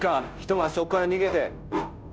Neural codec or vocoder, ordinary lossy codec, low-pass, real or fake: codec, 16 kHz, 0.5 kbps, FunCodec, trained on Chinese and English, 25 frames a second; none; none; fake